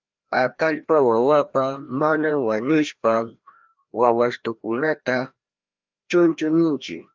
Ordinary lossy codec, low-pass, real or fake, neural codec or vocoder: Opus, 24 kbps; 7.2 kHz; fake; codec, 16 kHz, 1 kbps, FreqCodec, larger model